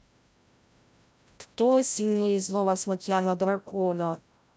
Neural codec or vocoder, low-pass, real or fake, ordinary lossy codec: codec, 16 kHz, 0.5 kbps, FreqCodec, larger model; none; fake; none